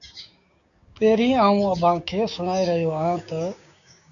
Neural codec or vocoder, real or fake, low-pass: codec, 16 kHz, 6 kbps, DAC; fake; 7.2 kHz